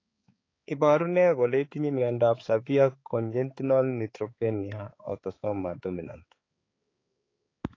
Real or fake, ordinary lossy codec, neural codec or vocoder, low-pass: fake; AAC, 32 kbps; codec, 16 kHz, 4 kbps, X-Codec, HuBERT features, trained on balanced general audio; 7.2 kHz